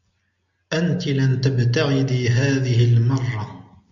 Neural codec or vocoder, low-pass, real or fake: none; 7.2 kHz; real